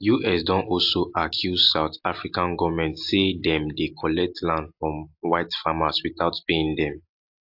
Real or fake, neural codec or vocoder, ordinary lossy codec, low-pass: real; none; AAC, 48 kbps; 5.4 kHz